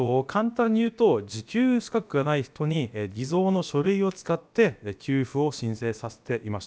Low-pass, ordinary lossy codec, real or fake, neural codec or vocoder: none; none; fake; codec, 16 kHz, about 1 kbps, DyCAST, with the encoder's durations